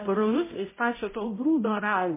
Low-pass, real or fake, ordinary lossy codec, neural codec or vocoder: 3.6 kHz; fake; MP3, 16 kbps; codec, 16 kHz, 0.5 kbps, X-Codec, HuBERT features, trained on balanced general audio